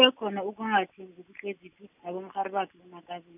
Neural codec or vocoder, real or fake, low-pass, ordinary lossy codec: none; real; 3.6 kHz; none